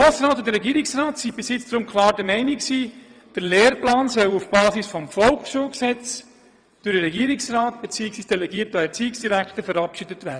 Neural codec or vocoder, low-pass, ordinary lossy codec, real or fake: vocoder, 22.05 kHz, 80 mel bands, WaveNeXt; 9.9 kHz; none; fake